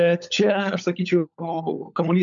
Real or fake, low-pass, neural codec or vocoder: fake; 7.2 kHz; codec, 16 kHz, 16 kbps, FunCodec, trained on LibriTTS, 50 frames a second